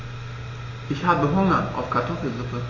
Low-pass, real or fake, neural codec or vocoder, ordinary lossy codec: 7.2 kHz; real; none; AAC, 32 kbps